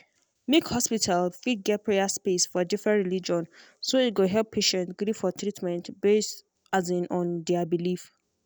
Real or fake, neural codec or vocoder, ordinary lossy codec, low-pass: real; none; none; none